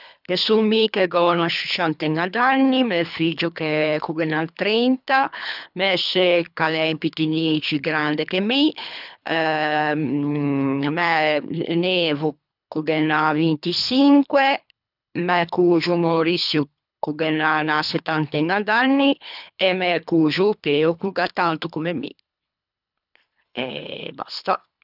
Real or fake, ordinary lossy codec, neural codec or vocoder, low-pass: fake; none; codec, 24 kHz, 3 kbps, HILCodec; 5.4 kHz